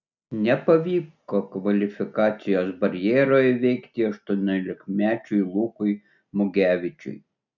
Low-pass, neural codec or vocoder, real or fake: 7.2 kHz; none; real